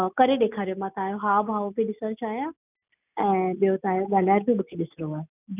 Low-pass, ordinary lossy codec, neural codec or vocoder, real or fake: 3.6 kHz; none; none; real